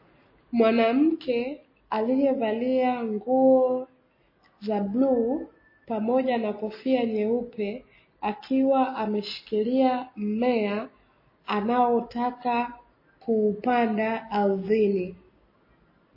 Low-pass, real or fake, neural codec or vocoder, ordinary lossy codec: 5.4 kHz; real; none; MP3, 24 kbps